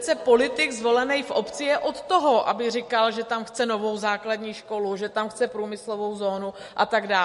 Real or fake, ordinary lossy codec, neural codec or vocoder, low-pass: real; MP3, 48 kbps; none; 14.4 kHz